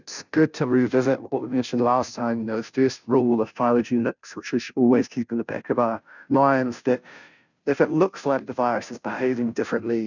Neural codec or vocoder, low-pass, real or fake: codec, 16 kHz, 0.5 kbps, FunCodec, trained on Chinese and English, 25 frames a second; 7.2 kHz; fake